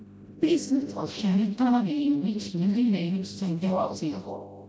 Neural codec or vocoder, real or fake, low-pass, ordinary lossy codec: codec, 16 kHz, 0.5 kbps, FreqCodec, smaller model; fake; none; none